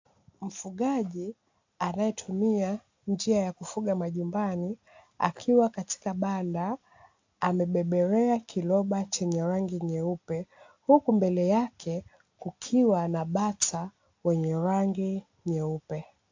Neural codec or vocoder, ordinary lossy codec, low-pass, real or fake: none; AAC, 48 kbps; 7.2 kHz; real